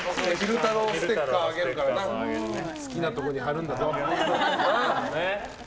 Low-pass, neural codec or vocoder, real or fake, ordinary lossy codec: none; none; real; none